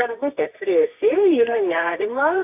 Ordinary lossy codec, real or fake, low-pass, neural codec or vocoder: AAC, 32 kbps; fake; 3.6 kHz; codec, 24 kHz, 0.9 kbps, WavTokenizer, medium music audio release